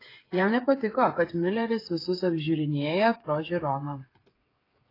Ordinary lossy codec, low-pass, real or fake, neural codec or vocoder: AAC, 24 kbps; 5.4 kHz; fake; codec, 16 kHz, 8 kbps, FreqCodec, smaller model